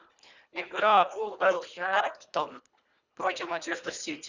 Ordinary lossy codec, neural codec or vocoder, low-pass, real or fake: Opus, 64 kbps; codec, 24 kHz, 1.5 kbps, HILCodec; 7.2 kHz; fake